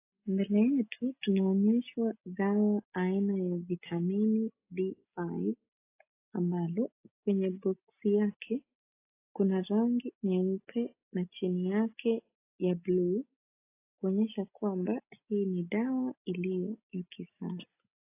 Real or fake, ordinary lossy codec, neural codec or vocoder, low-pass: real; AAC, 24 kbps; none; 3.6 kHz